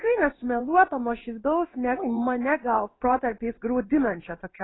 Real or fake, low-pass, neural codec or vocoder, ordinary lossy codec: fake; 7.2 kHz; codec, 16 kHz in and 24 kHz out, 1 kbps, XY-Tokenizer; AAC, 16 kbps